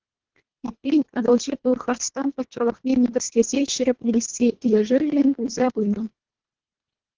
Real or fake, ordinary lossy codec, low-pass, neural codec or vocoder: fake; Opus, 16 kbps; 7.2 kHz; codec, 24 kHz, 1.5 kbps, HILCodec